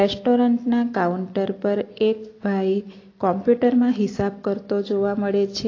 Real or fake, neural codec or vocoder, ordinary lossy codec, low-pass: real; none; AAC, 32 kbps; 7.2 kHz